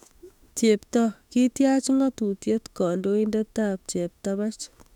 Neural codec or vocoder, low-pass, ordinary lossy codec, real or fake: autoencoder, 48 kHz, 32 numbers a frame, DAC-VAE, trained on Japanese speech; 19.8 kHz; none; fake